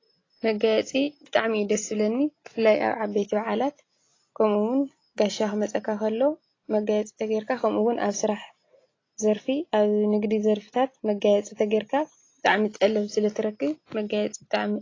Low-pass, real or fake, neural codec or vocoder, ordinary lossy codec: 7.2 kHz; real; none; AAC, 32 kbps